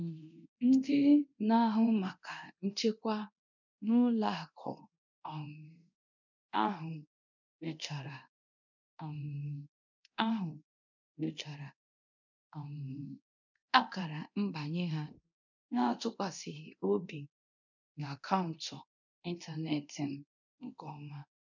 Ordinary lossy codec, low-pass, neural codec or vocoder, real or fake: none; 7.2 kHz; codec, 24 kHz, 0.9 kbps, DualCodec; fake